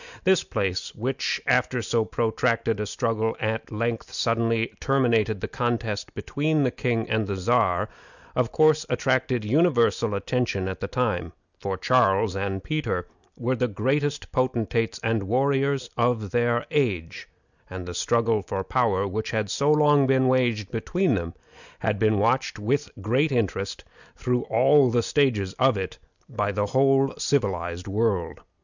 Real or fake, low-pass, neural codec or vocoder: real; 7.2 kHz; none